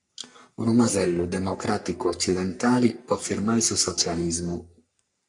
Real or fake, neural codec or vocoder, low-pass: fake; codec, 44.1 kHz, 3.4 kbps, Pupu-Codec; 10.8 kHz